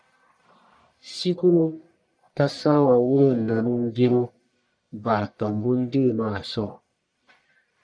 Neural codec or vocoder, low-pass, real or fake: codec, 44.1 kHz, 1.7 kbps, Pupu-Codec; 9.9 kHz; fake